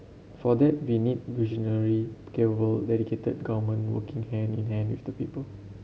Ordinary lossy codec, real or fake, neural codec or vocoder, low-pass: none; real; none; none